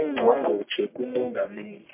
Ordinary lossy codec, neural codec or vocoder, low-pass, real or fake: MP3, 32 kbps; codec, 44.1 kHz, 1.7 kbps, Pupu-Codec; 3.6 kHz; fake